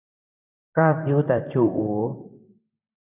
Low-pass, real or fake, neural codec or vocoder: 3.6 kHz; fake; vocoder, 44.1 kHz, 128 mel bands, Pupu-Vocoder